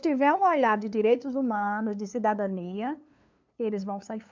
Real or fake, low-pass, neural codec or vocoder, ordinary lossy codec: fake; 7.2 kHz; codec, 16 kHz, 2 kbps, FunCodec, trained on LibriTTS, 25 frames a second; MP3, 64 kbps